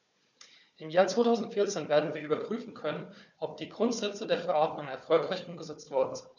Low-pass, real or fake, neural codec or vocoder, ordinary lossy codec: 7.2 kHz; fake; codec, 16 kHz, 4 kbps, FunCodec, trained on Chinese and English, 50 frames a second; none